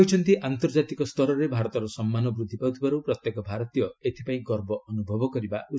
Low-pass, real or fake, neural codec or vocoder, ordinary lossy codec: none; real; none; none